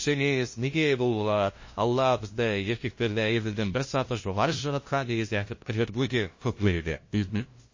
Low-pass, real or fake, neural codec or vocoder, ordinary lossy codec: 7.2 kHz; fake; codec, 16 kHz, 0.5 kbps, FunCodec, trained on LibriTTS, 25 frames a second; MP3, 32 kbps